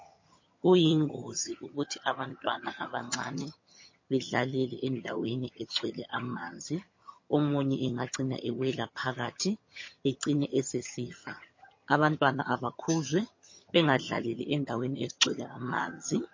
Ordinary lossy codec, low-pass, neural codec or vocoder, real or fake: MP3, 32 kbps; 7.2 kHz; vocoder, 22.05 kHz, 80 mel bands, HiFi-GAN; fake